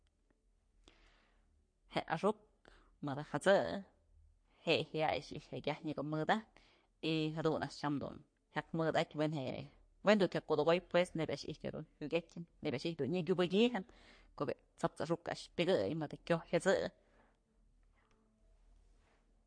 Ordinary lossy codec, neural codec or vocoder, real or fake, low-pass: MP3, 48 kbps; codec, 44.1 kHz, 3.4 kbps, Pupu-Codec; fake; 9.9 kHz